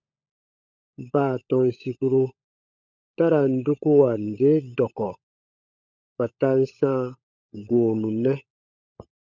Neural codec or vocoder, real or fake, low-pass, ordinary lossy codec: codec, 16 kHz, 16 kbps, FunCodec, trained on LibriTTS, 50 frames a second; fake; 7.2 kHz; MP3, 64 kbps